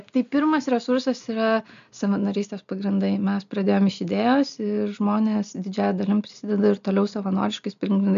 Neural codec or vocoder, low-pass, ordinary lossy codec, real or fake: none; 7.2 kHz; MP3, 64 kbps; real